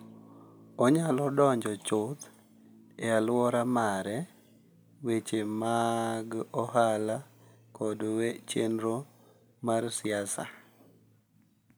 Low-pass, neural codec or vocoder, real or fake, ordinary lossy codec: none; none; real; none